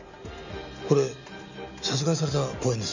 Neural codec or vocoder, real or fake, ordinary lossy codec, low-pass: none; real; none; 7.2 kHz